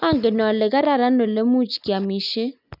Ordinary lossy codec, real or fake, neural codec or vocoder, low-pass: none; real; none; 5.4 kHz